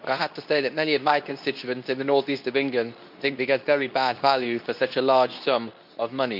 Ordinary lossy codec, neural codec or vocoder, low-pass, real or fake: none; codec, 24 kHz, 0.9 kbps, WavTokenizer, medium speech release version 2; 5.4 kHz; fake